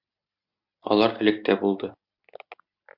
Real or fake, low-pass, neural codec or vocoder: real; 5.4 kHz; none